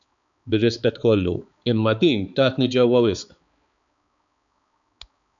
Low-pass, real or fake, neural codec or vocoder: 7.2 kHz; fake; codec, 16 kHz, 4 kbps, X-Codec, HuBERT features, trained on balanced general audio